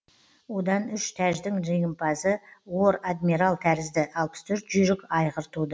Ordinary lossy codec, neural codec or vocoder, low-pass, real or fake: none; none; none; real